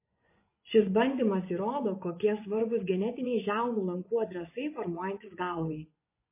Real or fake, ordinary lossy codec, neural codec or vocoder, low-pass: fake; MP3, 24 kbps; vocoder, 24 kHz, 100 mel bands, Vocos; 3.6 kHz